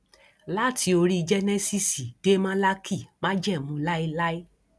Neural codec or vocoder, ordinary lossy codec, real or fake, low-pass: none; none; real; none